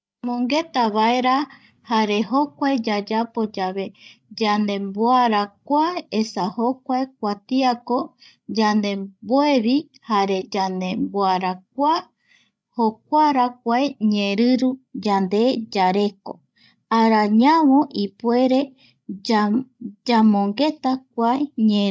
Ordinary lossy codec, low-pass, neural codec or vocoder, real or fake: none; none; codec, 16 kHz, 16 kbps, FreqCodec, larger model; fake